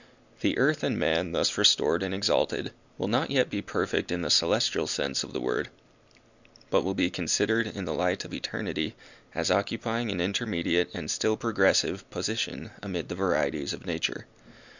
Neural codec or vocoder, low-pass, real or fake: none; 7.2 kHz; real